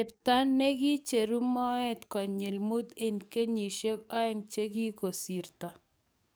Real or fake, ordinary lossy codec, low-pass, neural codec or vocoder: fake; none; none; codec, 44.1 kHz, 7.8 kbps, DAC